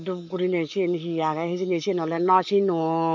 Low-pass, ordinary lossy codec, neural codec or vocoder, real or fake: 7.2 kHz; MP3, 48 kbps; codec, 44.1 kHz, 7.8 kbps, Pupu-Codec; fake